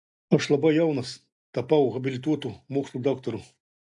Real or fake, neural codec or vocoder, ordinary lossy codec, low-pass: real; none; AAC, 64 kbps; 10.8 kHz